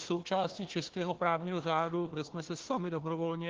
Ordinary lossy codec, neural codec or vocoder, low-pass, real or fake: Opus, 16 kbps; codec, 16 kHz, 1 kbps, FunCodec, trained on Chinese and English, 50 frames a second; 7.2 kHz; fake